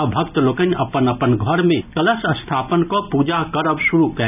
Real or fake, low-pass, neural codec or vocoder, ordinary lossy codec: real; 3.6 kHz; none; none